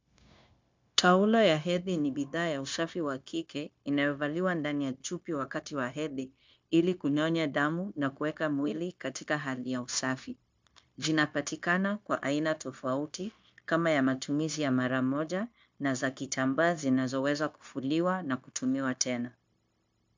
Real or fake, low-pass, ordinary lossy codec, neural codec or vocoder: fake; 7.2 kHz; MP3, 64 kbps; codec, 16 kHz, 0.9 kbps, LongCat-Audio-Codec